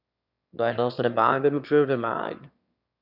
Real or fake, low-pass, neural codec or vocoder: fake; 5.4 kHz; autoencoder, 22.05 kHz, a latent of 192 numbers a frame, VITS, trained on one speaker